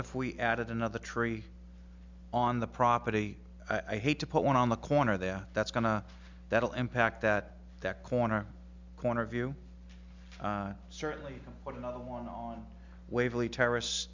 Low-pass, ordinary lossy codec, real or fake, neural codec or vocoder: 7.2 kHz; MP3, 64 kbps; real; none